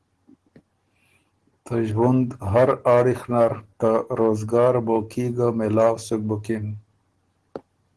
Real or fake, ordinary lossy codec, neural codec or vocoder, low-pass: real; Opus, 16 kbps; none; 10.8 kHz